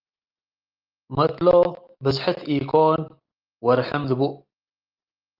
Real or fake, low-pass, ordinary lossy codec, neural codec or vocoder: real; 5.4 kHz; Opus, 32 kbps; none